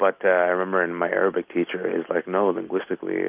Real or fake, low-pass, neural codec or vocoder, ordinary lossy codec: real; 3.6 kHz; none; Opus, 32 kbps